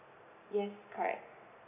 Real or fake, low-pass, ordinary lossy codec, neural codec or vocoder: real; 3.6 kHz; AAC, 24 kbps; none